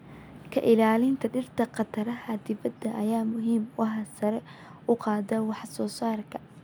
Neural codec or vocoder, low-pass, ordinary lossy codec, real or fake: none; none; none; real